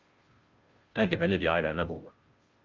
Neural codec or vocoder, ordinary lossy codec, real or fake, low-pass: codec, 16 kHz, 0.5 kbps, FunCodec, trained on Chinese and English, 25 frames a second; Opus, 32 kbps; fake; 7.2 kHz